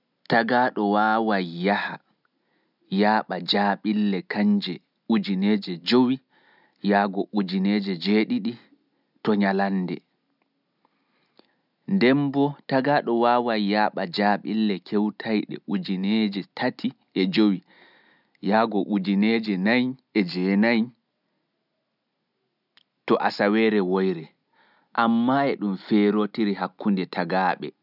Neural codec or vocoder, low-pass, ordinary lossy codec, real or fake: none; 5.4 kHz; none; real